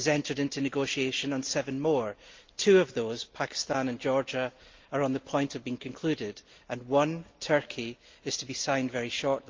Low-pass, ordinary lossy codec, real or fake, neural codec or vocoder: 7.2 kHz; Opus, 24 kbps; real; none